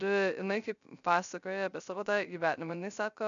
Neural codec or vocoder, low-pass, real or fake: codec, 16 kHz, 0.3 kbps, FocalCodec; 7.2 kHz; fake